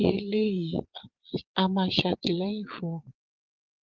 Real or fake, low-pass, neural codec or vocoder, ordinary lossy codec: fake; 7.2 kHz; vocoder, 22.05 kHz, 80 mel bands, Vocos; Opus, 16 kbps